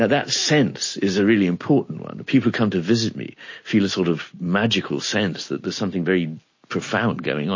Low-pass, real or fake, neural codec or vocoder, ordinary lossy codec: 7.2 kHz; real; none; MP3, 32 kbps